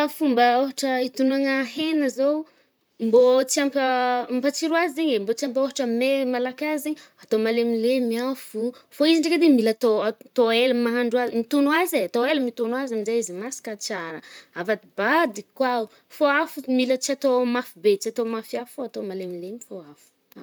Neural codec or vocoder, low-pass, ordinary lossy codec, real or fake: vocoder, 44.1 kHz, 128 mel bands, Pupu-Vocoder; none; none; fake